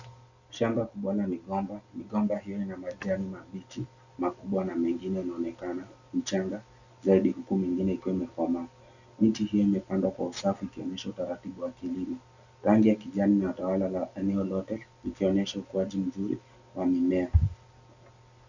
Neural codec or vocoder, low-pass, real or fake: none; 7.2 kHz; real